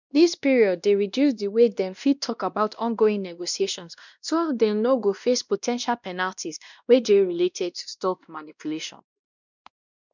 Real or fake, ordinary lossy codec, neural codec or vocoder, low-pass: fake; none; codec, 16 kHz, 1 kbps, X-Codec, WavLM features, trained on Multilingual LibriSpeech; 7.2 kHz